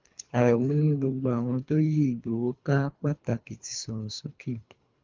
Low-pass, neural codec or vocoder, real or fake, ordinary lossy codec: 7.2 kHz; codec, 24 kHz, 3 kbps, HILCodec; fake; Opus, 24 kbps